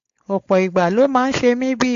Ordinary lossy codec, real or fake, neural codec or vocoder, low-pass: AAC, 48 kbps; fake; codec, 16 kHz, 4.8 kbps, FACodec; 7.2 kHz